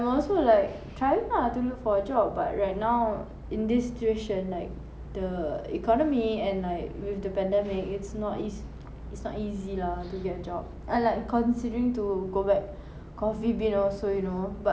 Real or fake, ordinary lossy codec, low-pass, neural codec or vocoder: real; none; none; none